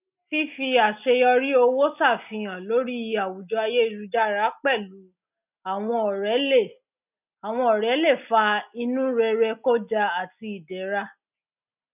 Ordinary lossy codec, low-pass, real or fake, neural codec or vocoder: none; 3.6 kHz; real; none